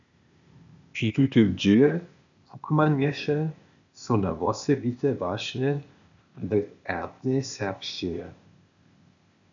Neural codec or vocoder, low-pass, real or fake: codec, 16 kHz, 0.8 kbps, ZipCodec; 7.2 kHz; fake